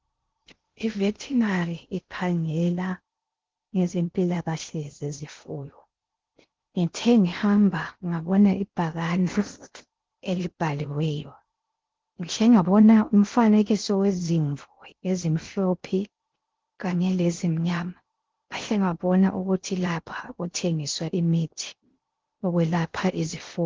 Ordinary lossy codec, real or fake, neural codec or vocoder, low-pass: Opus, 16 kbps; fake; codec, 16 kHz in and 24 kHz out, 0.6 kbps, FocalCodec, streaming, 2048 codes; 7.2 kHz